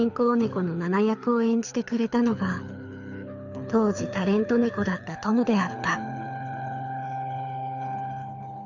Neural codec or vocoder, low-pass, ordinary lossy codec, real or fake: codec, 24 kHz, 6 kbps, HILCodec; 7.2 kHz; none; fake